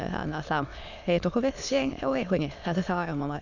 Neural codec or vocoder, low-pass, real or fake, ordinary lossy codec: autoencoder, 22.05 kHz, a latent of 192 numbers a frame, VITS, trained on many speakers; 7.2 kHz; fake; none